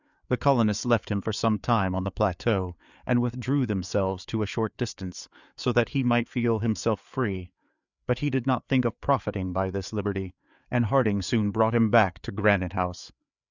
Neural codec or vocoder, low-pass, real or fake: codec, 16 kHz, 4 kbps, FreqCodec, larger model; 7.2 kHz; fake